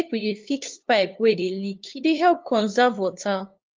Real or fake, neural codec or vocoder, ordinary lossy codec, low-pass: fake; codec, 16 kHz, 2 kbps, FunCodec, trained on LibriTTS, 25 frames a second; Opus, 32 kbps; 7.2 kHz